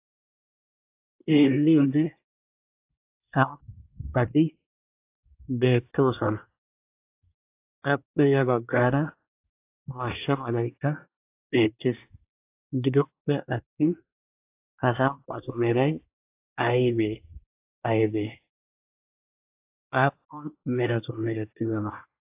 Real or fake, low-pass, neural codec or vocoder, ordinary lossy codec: fake; 3.6 kHz; codec, 24 kHz, 1 kbps, SNAC; AAC, 24 kbps